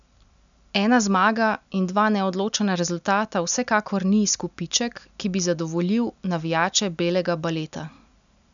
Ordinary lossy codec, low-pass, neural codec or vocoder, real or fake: none; 7.2 kHz; none; real